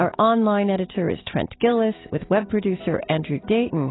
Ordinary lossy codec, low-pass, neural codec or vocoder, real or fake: AAC, 16 kbps; 7.2 kHz; none; real